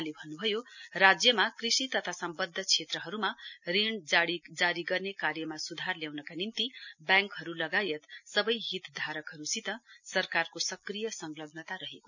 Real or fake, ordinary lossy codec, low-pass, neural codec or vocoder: real; none; 7.2 kHz; none